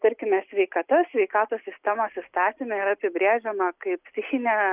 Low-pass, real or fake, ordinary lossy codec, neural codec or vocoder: 3.6 kHz; real; Opus, 64 kbps; none